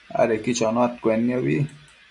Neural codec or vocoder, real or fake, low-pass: none; real; 10.8 kHz